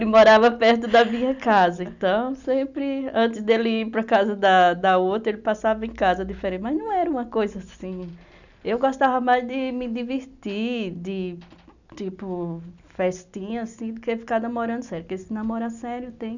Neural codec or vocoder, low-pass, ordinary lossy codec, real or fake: none; 7.2 kHz; none; real